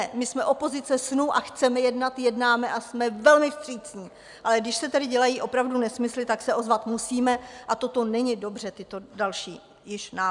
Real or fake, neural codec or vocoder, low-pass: real; none; 10.8 kHz